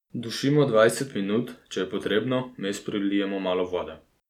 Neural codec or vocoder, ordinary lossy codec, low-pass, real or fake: none; none; 19.8 kHz; real